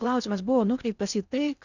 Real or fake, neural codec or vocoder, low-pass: fake; codec, 16 kHz in and 24 kHz out, 0.6 kbps, FocalCodec, streaming, 4096 codes; 7.2 kHz